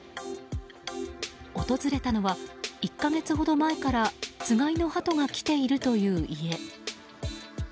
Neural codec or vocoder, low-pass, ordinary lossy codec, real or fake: none; none; none; real